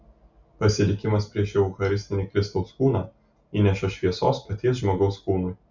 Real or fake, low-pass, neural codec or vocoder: real; 7.2 kHz; none